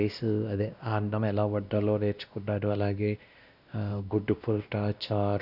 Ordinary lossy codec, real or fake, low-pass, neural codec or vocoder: none; fake; 5.4 kHz; codec, 16 kHz, 1 kbps, X-Codec, WavLM features, trained on Multilingual LibriSpeech